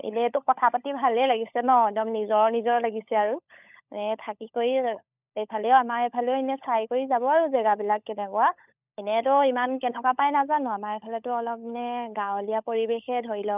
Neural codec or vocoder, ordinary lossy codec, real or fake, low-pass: codec, 16 kHz, 16 kbps, FunCodec, trained on LibriTTS, 50 frames a second; none; fake; 3.6 kHz